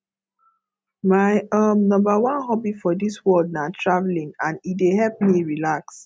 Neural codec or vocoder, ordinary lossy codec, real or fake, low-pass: none; none; real; none